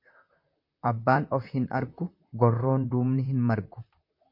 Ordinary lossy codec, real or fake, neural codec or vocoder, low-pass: MP3, 32 kbps; fake; vocoder, 44.1 kHz, 128 mel bands, Pupu-Vocoder; 5.4 kHz